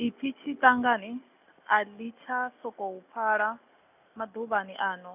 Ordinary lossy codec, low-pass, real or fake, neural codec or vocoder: none; 3.6 kHz; real; none